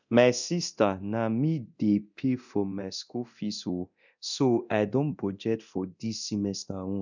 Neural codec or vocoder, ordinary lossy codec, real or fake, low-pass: codec, 24 kHz, 0.9 kbps, DualCodec; none; fake; 7.2 kHz